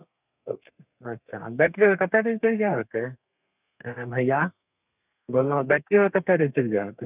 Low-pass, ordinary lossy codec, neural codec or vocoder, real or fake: 3.6 kHz; none; codec, 32 kHz, 1.9 kbps, SNAC; fake